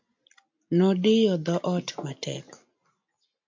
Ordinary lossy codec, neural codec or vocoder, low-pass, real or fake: MP3, 48 kbps; none; 7.2 kHz; real